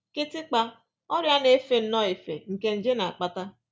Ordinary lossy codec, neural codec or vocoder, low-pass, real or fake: none; none; none; real